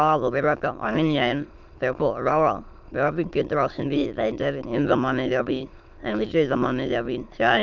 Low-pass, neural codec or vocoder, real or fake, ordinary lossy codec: 7.2 kHz; autoencoder, 22.05 kHz, a latent of 192 numbers a frame, VITS, trained on many speakers; fake; Opus, 24 kbps